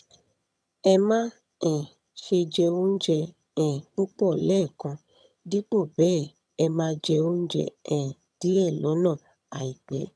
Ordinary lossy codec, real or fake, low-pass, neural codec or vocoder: none; fake; none; vocoder, 22.05 kHz, 80 mel bands, HiFi-GAN